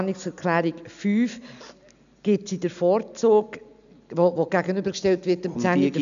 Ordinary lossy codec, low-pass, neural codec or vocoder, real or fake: none; 7.2 kHz; none; real